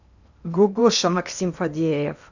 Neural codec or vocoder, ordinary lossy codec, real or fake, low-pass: codec, 16 kHz in and 24 kHz out, 0.8 kbps, FocalCodec, streaming, 65536 codes; none; fake; 7.2 kHz